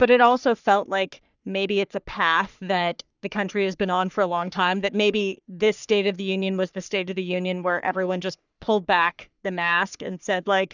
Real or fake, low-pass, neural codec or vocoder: fake; 7.2 kHz; codec, 44.1 kHz, 3.4 kbps, Pupu-Codec